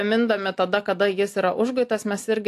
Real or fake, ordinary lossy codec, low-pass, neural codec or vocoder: real; AAC, 64 kbps; 14.4 kHz; none